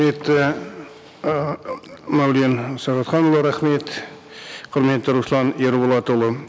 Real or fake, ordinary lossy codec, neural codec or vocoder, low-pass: real; none; none; none